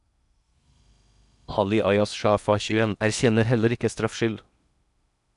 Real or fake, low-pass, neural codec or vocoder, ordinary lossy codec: fake; 10.8 kHz; codec, 16 kHz in and 24 kHz out, 0.8 kbps, FocalCodec, streaming, 65536 codes; none